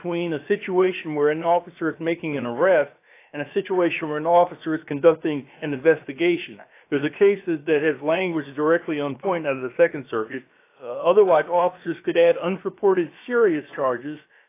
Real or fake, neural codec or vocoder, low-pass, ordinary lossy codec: fake; codec, 16 kHz, about 1 kbps, DyCAST, with the encoder's durations; 3.6 kHz; AAC, 24 kbps